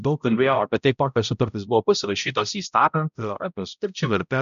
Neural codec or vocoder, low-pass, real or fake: codec, 16 kHz, 0.5 kbps, X-Codec, HuBERT features, trained on balanced general audio; 7.2 kHz; fake